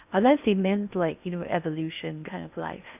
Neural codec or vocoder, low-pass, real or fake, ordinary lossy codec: codec, 16 kHz in and 24 kHz out, 0.8 kbps, FocalCodec, streaming, 65536 codes; 3.6 kHz; fake; none